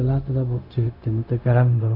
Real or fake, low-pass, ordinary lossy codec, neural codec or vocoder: fake; 5.4 kHz; none; codec, 16 kHz, 0.4 kbps, LongCat-Audio-Codec